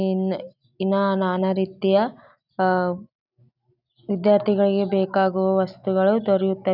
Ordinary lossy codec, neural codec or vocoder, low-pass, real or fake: none; none; 5.4 kHz; real